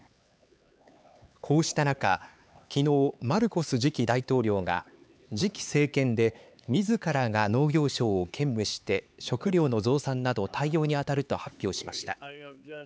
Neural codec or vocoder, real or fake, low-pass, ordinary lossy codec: codec, 16 kHz, 4 kbps, X-Codec, HuBERT features, trained on LibriSpeech; fake; none; none